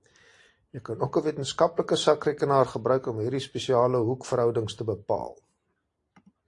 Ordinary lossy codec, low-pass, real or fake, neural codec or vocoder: AAC, 48 kbps; 10.8 kHz; real; none